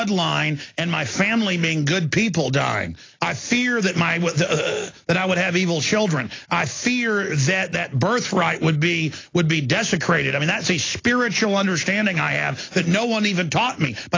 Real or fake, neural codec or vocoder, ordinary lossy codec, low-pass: real; none; AAC, 32 kbps; 7.2 kHz